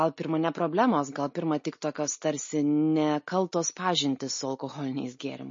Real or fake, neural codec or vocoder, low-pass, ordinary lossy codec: real; none; 7.2 kHz; MP3, 32 kbps